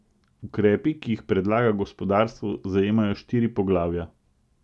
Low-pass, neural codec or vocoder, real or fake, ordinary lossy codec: none; none; real; none